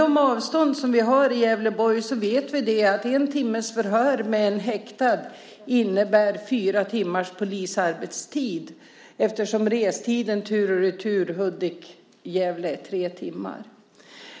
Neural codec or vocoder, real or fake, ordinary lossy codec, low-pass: none; real; none; none